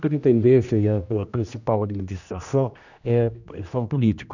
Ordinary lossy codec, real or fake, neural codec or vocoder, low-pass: none; fake; codec, 16 kHz, 1 kbps, X-Codec, HuBERT features, trained on general audio; 7.2 kHz